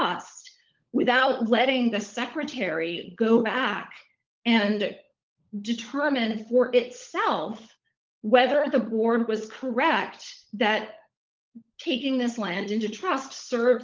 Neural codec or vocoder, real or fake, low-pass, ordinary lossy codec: codec, 16 kHz, 16 kbps, FunCodec, trained on LibriTTS, 50 frames a second; fake; 7.2 kHz; Opus, 32 kbps